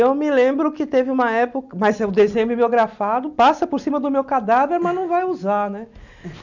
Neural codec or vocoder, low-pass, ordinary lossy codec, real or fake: none; 7.2 kHz; none; real